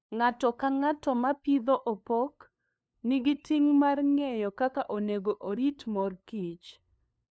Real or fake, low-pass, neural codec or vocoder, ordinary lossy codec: fake; none; codec, 16 kHz, 2 kbps, FunCodec, trained on LibriTTS, 25 frames a second; none